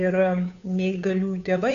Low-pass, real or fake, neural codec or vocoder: 7.2 kHz; fake; codec, 16 kHz, 2 kbps, FunCodec, trained on Chinese and English, 25 frames a second